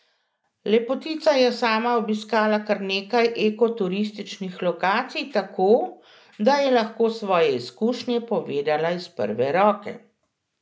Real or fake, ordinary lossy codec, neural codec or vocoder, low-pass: real; none; none; none